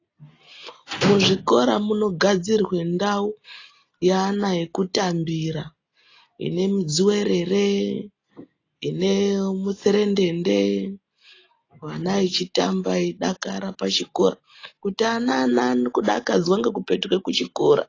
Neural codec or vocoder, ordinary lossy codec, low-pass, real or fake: none; AAC, 32 kbps; 7.2 kHz; real